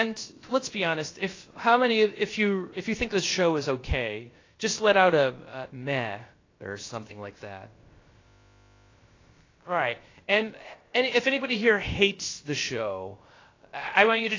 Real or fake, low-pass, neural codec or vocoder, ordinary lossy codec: fake; 7.2 kHz; codec, 16 kHz, about 1 kbps, DyCAST, with the encoder's durations; AAC, 32 kbps